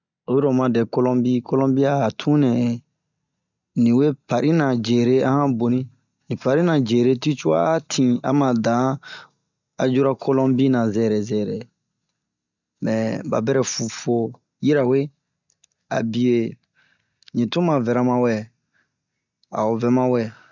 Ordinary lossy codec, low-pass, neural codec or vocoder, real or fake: none; 7.2 kHz; none; real